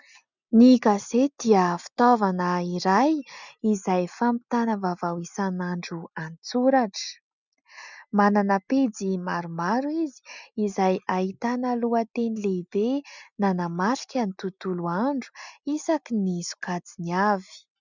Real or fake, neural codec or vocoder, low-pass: real; none; 7.2 kHz